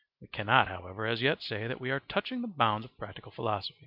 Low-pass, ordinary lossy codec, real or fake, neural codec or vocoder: 5.4 kHz; MP3, 48 kbps; real; none